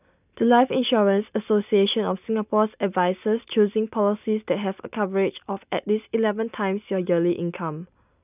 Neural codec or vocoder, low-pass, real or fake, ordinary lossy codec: none; 3.6 kHz; real; none